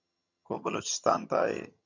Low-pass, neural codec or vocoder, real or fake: 7.2 kHz; vocoder, 22.05 kHz, 80 mel bands, HiFi-GAN; fake